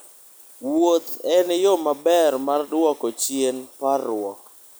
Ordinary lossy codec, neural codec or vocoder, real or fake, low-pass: none; none; real; none